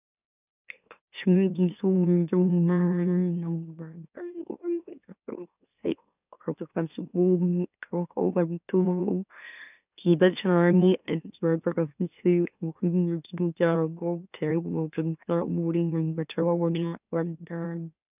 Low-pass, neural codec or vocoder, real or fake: 3.6 kHz; autoencoder, 44.1 kHz, a latent of 192 numbers a frame, MeloTTS; fake